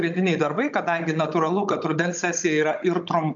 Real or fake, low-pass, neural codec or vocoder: fake; 7.2 kHz; codec, 16 kHz, 16 kbps, FunCodec, trained on Chinese and English, 50 frames a second